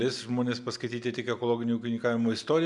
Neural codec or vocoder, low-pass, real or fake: none; 10.8 kHz; real